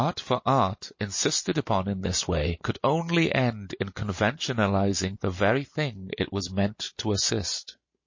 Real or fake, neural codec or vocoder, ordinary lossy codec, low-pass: real; none; MP3, 32 kbps; 7.2 kHz